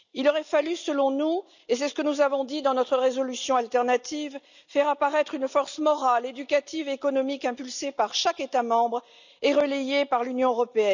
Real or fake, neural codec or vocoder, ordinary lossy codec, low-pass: real; none; none; 7.2 kHz